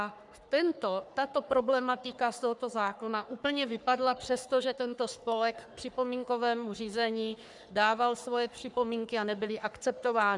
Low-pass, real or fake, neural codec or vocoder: 10.8 kHz; fake; codec, 44.1 kHz, 3.4 kbps, Pupu-Codec